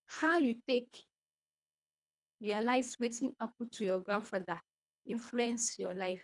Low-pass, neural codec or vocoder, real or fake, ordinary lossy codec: 10.8 kHz; codec, 24 kHz, 1.5 kbps, HILCodec; fake; MP3, 96 kbps